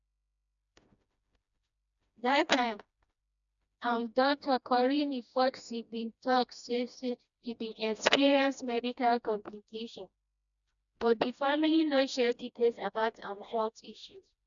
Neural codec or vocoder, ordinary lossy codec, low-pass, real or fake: codec, 16 kHz, 1 kbps, FreqCodec, smaller model; none; 7.2 kHz; fake